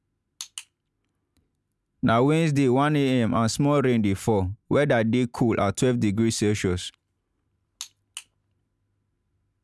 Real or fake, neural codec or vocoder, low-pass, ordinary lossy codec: real; none; none; none